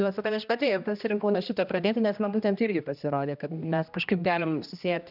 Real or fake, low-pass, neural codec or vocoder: fake; 5.4 kHz; codec, 16 kHz, 1 kbps, X-Codec, HuBERT features, trained on general audio